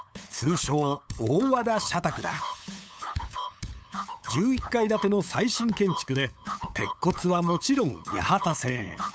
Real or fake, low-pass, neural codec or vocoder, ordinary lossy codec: fake; none; codec, 16 kHz, 8 kbps, FunCodec, trained on LibriTTS, 25 frames a second; none